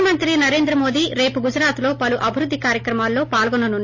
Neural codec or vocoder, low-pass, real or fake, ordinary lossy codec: none; 7.2 kHz; real; MP3, 32 kbps